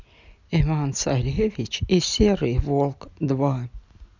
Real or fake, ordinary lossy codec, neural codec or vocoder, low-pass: real; none; none; 7.2 kHz